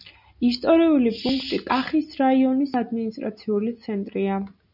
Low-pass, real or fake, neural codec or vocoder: 5.4 kHz; real; none